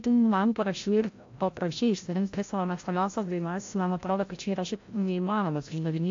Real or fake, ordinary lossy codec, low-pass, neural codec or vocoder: fake; AAC, 48 kbps; 7.2 kHz; codec, 16 kHz, 0.5 kbps, FreqCodec, larger model